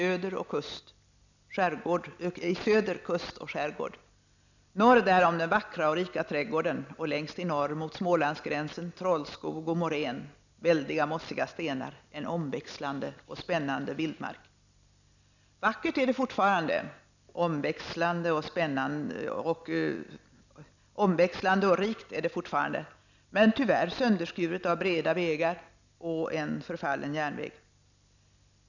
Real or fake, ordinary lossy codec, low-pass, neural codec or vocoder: real; none; 7.2 kHz; none